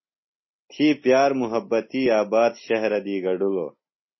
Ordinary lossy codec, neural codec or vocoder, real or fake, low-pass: MP3, 24 kbps; none; real; 7.2 kHz